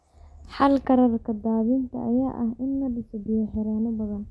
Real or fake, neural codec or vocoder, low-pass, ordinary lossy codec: real; none; none; none